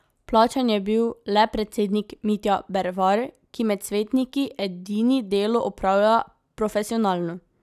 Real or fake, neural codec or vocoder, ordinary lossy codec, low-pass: real; none; none; 14.4 kHz